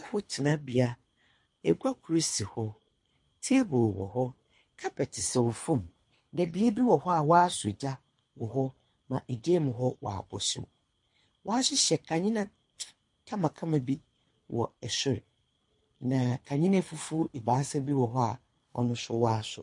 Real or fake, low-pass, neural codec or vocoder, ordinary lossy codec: fake; 10.8 kHz; codec, 24 kHz, 3 kbps, HILCodec; MP3, 48 kbps